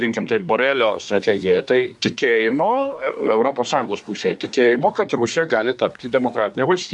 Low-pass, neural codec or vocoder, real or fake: 10.8 kHz; codec, 24 kHz, 1 kbps, SNAC; fake